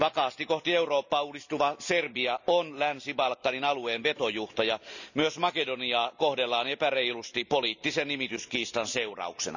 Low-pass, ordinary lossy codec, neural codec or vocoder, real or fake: 7.2 kHz; none; none; real